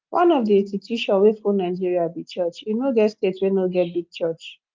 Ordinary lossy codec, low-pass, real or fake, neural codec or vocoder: Opus, 24 kbps; 7.2 kHz; real; none